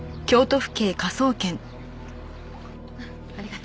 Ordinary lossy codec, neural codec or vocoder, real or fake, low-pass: none; none; real; none